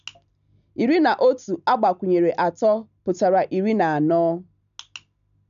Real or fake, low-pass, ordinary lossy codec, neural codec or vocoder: real; 7.2 kHz; none; none